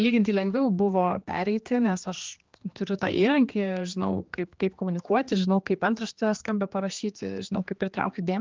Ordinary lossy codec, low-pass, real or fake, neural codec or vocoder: Opus, 32 kbps; 7.2 kHz; fake; codec, 16 kHz, 2 kbps, X-Codec, HuBERT features, trained on general audio